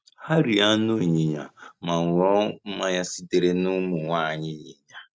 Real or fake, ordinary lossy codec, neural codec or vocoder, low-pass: real; none; none; none